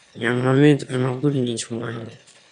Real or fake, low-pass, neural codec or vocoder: fake; 9.9 kHz; autoencoder, 22.05 kHz, a latent of 192 numbers a frame, VITS, trained on one speaker